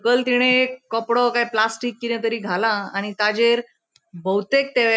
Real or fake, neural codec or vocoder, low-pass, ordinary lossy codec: real; none; none; none